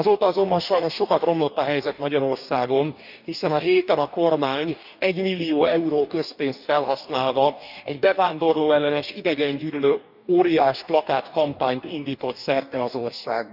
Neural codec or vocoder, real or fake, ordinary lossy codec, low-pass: codec, 44.1 kHz, 2.6 kbps, DAC; fake; none; 5.4 kHz